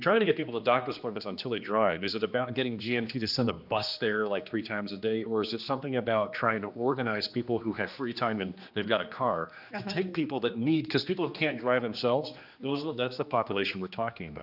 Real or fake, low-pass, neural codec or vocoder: fake; 5.4 kHz; codec, 16 kHz, 2 kbps, X-Codec, HuBERT features, trained on general audio